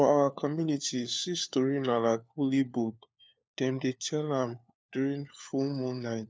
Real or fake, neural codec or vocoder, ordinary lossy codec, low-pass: fake; codec, 16 kHz, 4 kbps, FunCodec, trained on LibriTTS, 50 frames a second; none; none